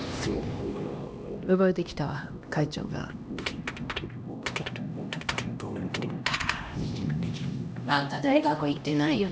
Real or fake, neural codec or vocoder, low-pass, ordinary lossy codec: fake; codec, 16 kHz, 1 kbps, X-Codec, HuBERT features, trained on LibriSpeech; none; none